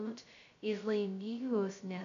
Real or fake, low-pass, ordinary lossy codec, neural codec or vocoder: fake; 7.2 kHz; AAC, 96 kbps; codec, 16 kHz, 0.2 kbps, FocalCodec